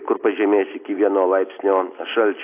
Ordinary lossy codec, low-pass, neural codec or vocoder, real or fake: AAC, 24 kbps; 3.6 kHz; none; real